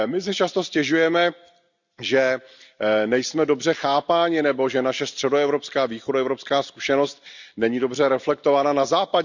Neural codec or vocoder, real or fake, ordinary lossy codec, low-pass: none; real; none; 7.2 kHz